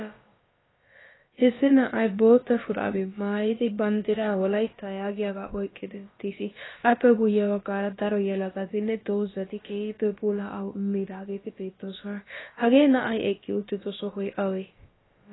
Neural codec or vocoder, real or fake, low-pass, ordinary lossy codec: codec, 16 kHz, about 1 kbps, DyCAST, with the encoder's durations; fake; 7.2 kHz; AAC, 16 kbps